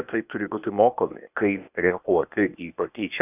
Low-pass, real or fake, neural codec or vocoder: 3.6 kHz; fake; codec, 16 kHz, 0.8 kbps, ZipCodec